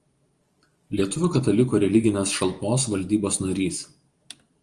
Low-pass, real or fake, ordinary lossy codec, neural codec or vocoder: 10.8 kHz; fake; Opus, 24 kbps; vocoder, 44.1 kHz, 128 mel bands every 512 samples, BigVGAN v2